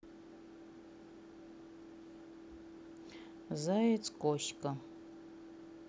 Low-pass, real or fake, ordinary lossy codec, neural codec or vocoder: none; real; none; none